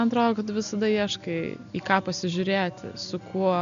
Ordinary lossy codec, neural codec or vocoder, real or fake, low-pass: MP3, 96 kbps; none; real; 7.2 kHz